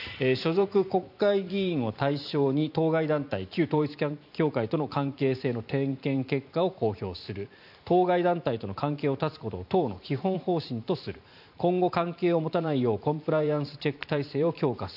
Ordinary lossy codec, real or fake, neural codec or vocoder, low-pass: none; fake; vocoder, 44.1 kHz, 128 mel bands every 512 samples, BigVGAN v2; 5.4 kHz